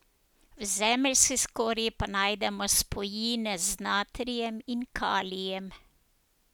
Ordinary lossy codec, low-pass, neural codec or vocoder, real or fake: none; none; none; real